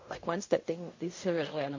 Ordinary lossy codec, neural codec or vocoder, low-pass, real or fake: MP3, 32 kbps; codec, 16 kHz in and 24 kHz out, 0.4 kbps, LongCat-Audio-Codec, fine tuned four codebook decoder; 7.2 kHz; fake